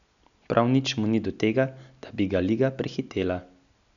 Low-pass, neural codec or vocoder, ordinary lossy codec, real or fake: 7.2 kHz; none; none; real